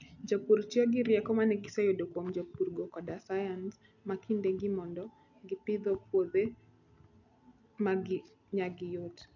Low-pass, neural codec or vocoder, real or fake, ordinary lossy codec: 7.2 kHz; none; real; none